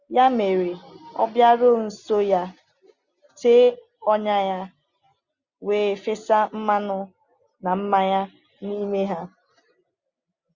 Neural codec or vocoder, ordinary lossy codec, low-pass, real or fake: none; Opus, 64 kbps; 7.2 kHz; real